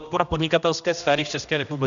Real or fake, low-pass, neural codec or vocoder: fake; 7.2 kHz; codec, 16 kHz, 1 kbps, X-Codec, HuBERT features, trained on general audio